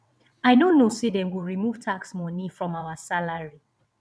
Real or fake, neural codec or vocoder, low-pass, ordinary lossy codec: fake; vocoder, 22.05 kHz, 80 mel bands, WaveNeXt; none; none